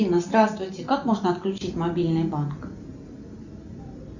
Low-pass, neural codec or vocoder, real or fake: 7.2 kHz; none; real